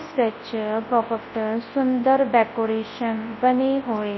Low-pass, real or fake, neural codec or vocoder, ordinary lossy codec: 7.2 kHz; fake; codec, 24 kHz, 0.9 kbps, WavTokenizer, large speech release; MP3, 24 kbps